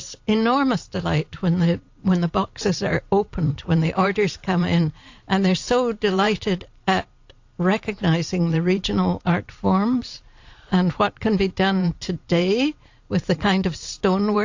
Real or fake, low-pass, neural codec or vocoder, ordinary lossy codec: fake; 7.2 kHz; vocoder, 44.1 kHz, 128 mel bands every 512 samples, BigVGAN v2; AAC, 48 kbps